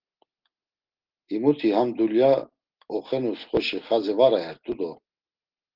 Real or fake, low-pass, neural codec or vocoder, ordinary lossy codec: real; 5.4 kHz; none; Opus, 16 kbps